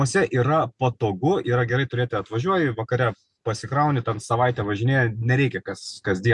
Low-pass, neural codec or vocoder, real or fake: 10.8 kHz; none; real